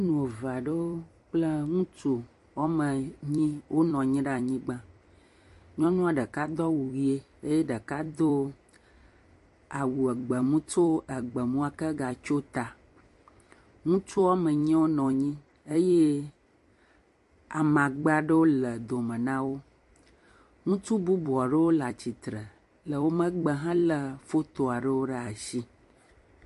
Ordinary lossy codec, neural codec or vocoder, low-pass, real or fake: MP3, 48 kbps; vocoder, 44.1 kHz, 128 mel bands every 256 samples, BigVGAN v2; 14.4 kHz; fake